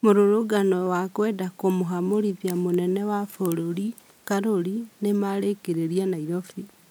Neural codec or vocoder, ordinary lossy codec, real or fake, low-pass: none; none; real; none